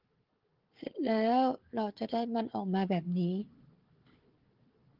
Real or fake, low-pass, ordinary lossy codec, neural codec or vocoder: fake; 5.4 kHz; Opus, 16 kbps; codec, 16 kHz, 4 kbps, FunCodec, trained on Chinese and English, 50 frames a second